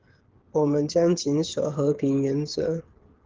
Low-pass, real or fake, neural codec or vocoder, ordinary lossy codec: 7.2 kHz; fake; codec, 16 kHz, 8 kbps, FreqCodec, smaller model; Opus, 16 kbps